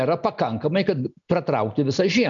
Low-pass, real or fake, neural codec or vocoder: 7.2 kHz; real; none